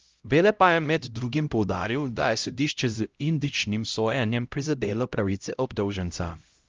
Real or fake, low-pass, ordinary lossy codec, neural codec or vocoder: fake; 7.2 kHz; Opus, 24 kbps; codec, 16 kHz, 0.5 kbps, X-Codec, HuBERT features, trained on LibriSpeech